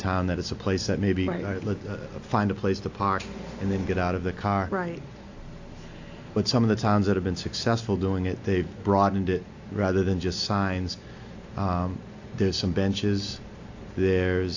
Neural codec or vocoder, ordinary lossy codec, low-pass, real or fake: none; AAC, 48 kbps; 7.2 kHz; real